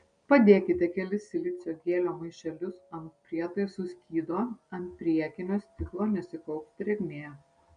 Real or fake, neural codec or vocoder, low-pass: real; none; 9.9 kHz